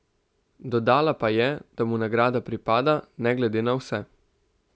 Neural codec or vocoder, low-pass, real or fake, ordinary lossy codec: none; none; real; none